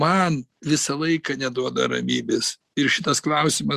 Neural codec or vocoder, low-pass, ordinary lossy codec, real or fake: none; 14.4 kHz; Opus, 64 kbps; real